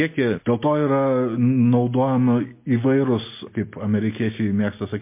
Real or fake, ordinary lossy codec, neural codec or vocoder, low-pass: real; MP3, 24 kbps; none; 3.6 kHz